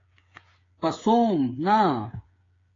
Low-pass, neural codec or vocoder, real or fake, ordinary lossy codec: 7.2 kHz; codec, 16 kHz, 8 kbps, FreqCodec, smaller model; fake; AAC, 32 kbps